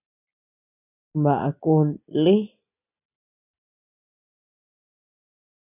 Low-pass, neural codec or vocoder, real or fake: 3.6 kHz; none; real